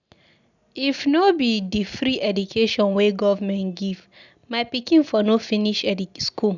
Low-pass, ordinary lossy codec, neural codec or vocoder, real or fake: 7.2 kHz; none; none; real